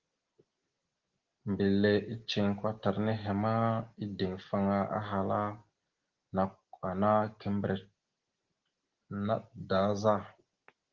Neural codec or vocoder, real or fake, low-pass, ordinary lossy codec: none; real; 7.2 kHz; Opus, 16 kbps